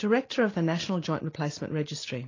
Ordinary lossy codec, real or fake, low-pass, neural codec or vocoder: AAC, 32 kbps; real; 7.2 kHz; none